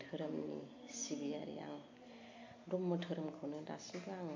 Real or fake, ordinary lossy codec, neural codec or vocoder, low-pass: real; MP3, 48 kbps; none; 7.2 kHz